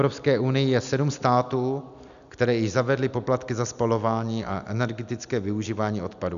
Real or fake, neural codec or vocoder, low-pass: real; none; 7.2 kHz